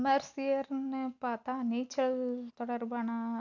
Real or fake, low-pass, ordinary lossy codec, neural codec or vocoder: real; 7.2 kHz; none; none